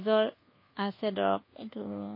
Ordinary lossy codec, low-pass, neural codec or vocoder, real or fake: MP3, 24 kbps; 5.4 kHz; codec, 24 kHz, 1.2 kbps, DualCodec; fake